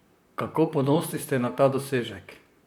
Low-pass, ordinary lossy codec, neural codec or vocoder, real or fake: none; none; vocoder, 44.1 kHz, 128 mel bands, Pupu-Vocoder; fake